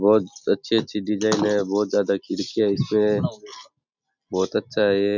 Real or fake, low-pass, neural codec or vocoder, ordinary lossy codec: real; 7.2 kHz; none; none